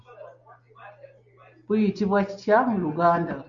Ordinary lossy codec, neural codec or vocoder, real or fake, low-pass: Opus, 64 kbps; none; real; 7.2 kHz